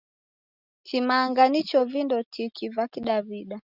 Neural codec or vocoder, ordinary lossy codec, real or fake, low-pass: none; Opus, 64 kbps; real; 5.4 kHz